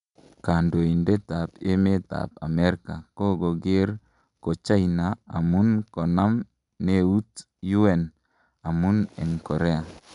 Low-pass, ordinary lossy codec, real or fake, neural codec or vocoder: 10.8 kHz; none; real; none